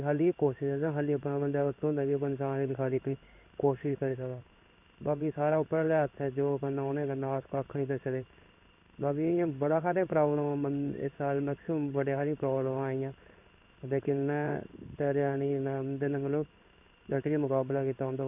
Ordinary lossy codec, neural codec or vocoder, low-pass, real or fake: none; codec, 16 kHz in and 24 kHz out, 1 kbps, XY-Tokenizer; 3.6 kHz; fake